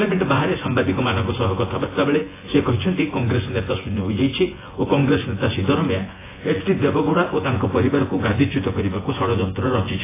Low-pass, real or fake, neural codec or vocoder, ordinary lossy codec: 3.6 kHz; fake; vocoder, 24 kHz, 100 mel bands, Vocos; AAC, 16 kbps